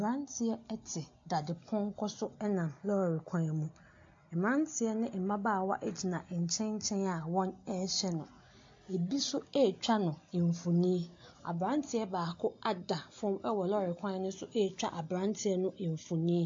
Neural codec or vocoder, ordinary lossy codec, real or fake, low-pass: none; AAC, 48 kbps; real; 7.2 kHz